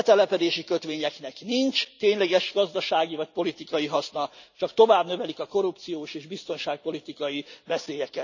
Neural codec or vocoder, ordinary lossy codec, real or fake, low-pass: none; none; real; 7.2 kHz